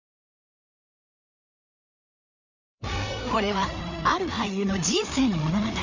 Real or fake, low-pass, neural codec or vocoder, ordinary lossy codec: fake; 7.2 kHz; codec, 16 kHz, 4 kbps, FreqCodec, larger model; Opus, 64 kbps